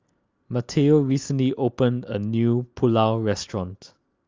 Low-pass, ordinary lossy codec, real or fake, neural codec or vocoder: 7.2 kHz; Opus, 32 kbps; real; none